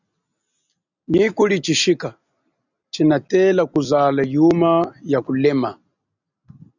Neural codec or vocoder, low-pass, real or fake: none; 7.2 kHz; real